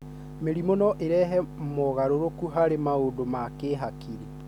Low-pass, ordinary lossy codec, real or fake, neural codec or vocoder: 19.8 kHz; none; real; none